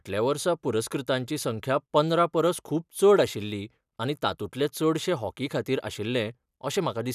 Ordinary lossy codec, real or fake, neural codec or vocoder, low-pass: none; real; none; 14.4 kHz